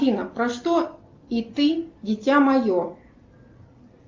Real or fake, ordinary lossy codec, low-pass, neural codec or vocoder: real; Opus, 32 kbps; 7.2 kHz; none